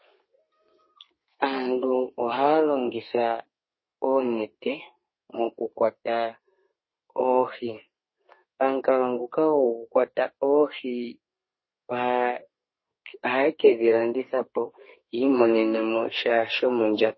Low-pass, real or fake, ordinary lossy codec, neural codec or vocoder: 7.2 kHz; fake; MP3, 24 kbps; codec, 32 kHz, 1.9 kbps, SNAC